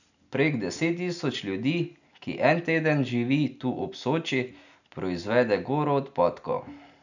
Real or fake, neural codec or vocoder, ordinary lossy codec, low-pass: real; none; none; 7.2 kHz